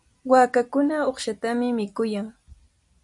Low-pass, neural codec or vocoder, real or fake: 10.8 kHz; none; real